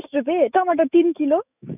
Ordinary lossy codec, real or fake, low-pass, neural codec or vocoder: none; fake; 3.6 kHz; autoencoder, 48 kHz, 128 numbers a frame, DAC-VAE, trained on Japanese speech